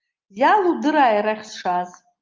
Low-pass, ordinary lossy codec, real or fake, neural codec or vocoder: 7.2 kHz; Opus, 24 kbps; real; none